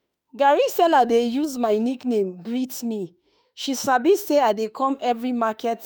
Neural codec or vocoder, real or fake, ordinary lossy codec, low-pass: autoencoder, 48 kHz, 32 numbers a frame, DAC-VAE, trained on Japanese speech; fake; none; none